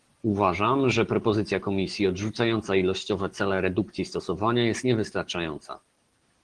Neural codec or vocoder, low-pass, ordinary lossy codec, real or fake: none; 9.9 kHz; Opus, 16 kbps; real